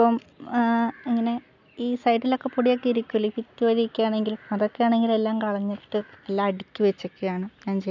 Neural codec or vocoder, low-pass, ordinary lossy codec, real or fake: none; 7.2 kHz; none; real